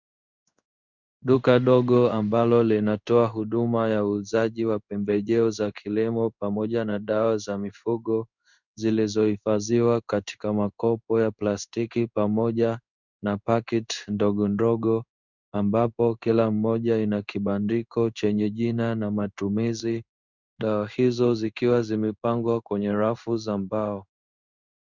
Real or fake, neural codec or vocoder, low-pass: fake; codec, 16 kHz in and 24 kHz out, 1 kbps, XY-Tokenizer; 7.2 kHz